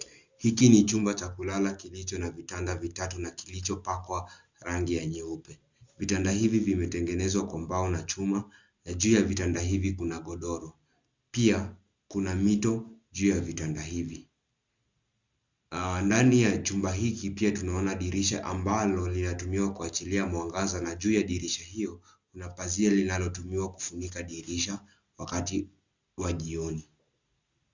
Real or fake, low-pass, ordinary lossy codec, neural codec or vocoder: real; 7.2 kHz; Opus, 64 kbps; none